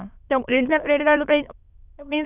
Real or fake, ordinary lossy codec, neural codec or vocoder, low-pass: fake; none; autoencoder, 22.05 kHz, a latent of 192 numbers a frame, VITS, trained on many speakers; 3.6 kHz